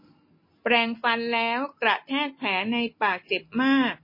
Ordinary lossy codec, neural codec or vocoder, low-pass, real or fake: MP3, 24 kbps; none; 5.4 kHz; real